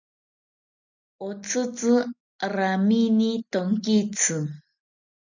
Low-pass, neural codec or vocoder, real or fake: 7.2 kHz; none; real